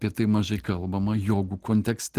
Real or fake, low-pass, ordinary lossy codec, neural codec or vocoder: real; 14.4 kHz; Opus, 16 kbps; none